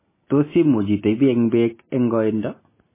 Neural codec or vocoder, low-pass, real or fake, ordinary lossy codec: none; 3.6 kHz; real; MP3, 16 kbps